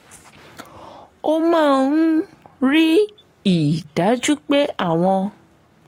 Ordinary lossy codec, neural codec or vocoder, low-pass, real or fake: AAC, 48 kbps; codec, 44.1 kHz, 7.8 kbps, Pupu-Codec; 19.8 kHz; fake